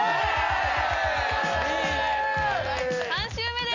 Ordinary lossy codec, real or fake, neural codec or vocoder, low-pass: MP3, 64 kbps; real; none; 7.2 kHz